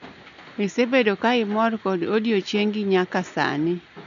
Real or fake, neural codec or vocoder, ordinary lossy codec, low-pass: real; none; none; 7.2 kHz